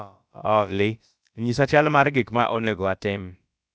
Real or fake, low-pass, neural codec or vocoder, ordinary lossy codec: fake; none; codec, 16 kHz, about 1 kbps, DyCAST, with the encoder's durations; none